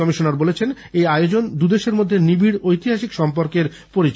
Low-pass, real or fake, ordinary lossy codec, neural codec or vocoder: none; real; none; none